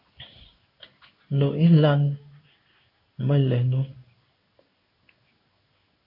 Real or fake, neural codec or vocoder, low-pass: fake; codec, 16 kHz in and 24 kHz out, 1 kbps, XY-Tokenizer; 5.4 kHz